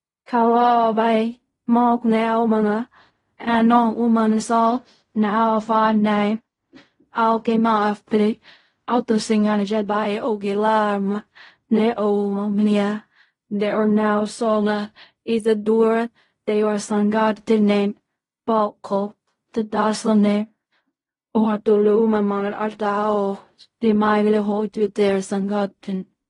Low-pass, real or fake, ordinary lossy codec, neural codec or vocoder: 10.8 kHz; fake; AAC, 32 kbps; codec, 16 kHz in and 24 kHz out, 0.4 kbps, LongCat-Audio-Codec, fine tuned four codebook decoder